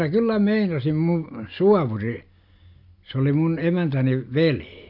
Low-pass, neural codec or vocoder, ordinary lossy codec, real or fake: 5.4 kHz; none; MP3, 48 kbps; real